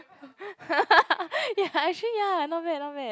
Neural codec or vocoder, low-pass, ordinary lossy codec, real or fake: none; none; none; real